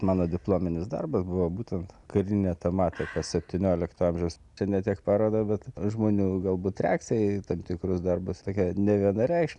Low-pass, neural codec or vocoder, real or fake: 10.8 kHz; none; real